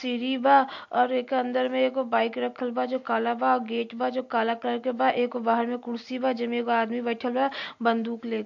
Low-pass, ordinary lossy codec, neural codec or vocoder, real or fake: 7.2 kHz; MP3, 48 kbps; none; real